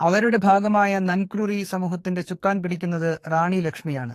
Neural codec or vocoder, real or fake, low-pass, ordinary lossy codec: codec, 44.1 kHz, 2.6 kbps, SNAC; fake; 14.4 kHz; AAC, 64 kbps